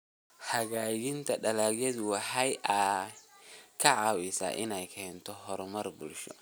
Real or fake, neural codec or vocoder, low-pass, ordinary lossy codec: real; none; none; none